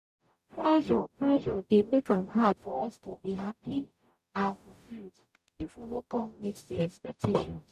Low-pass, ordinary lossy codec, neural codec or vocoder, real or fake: 14.4 kHz; none; codec, 44.1 kHz, 0.9 kbps, DAC; fake